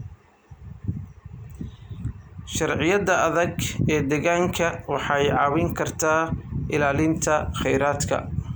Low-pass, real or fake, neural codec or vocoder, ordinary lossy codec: none; real; none; none